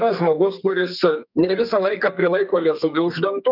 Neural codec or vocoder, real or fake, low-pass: codec, 32 kHz, 1.9 kbps, SNAC; fake; 5.4 kHz